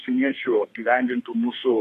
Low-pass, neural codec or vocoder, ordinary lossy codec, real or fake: 14.4 kHz; codec, 32 kHz, 1.9 kbps, SNAC; AAC, 48 kbps; fake